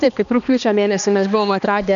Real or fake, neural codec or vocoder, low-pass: fake; codec, 16 kHz, 2 kbps, X-Codec, HuBERT features, trained on balanced general audio; 7.2 kHz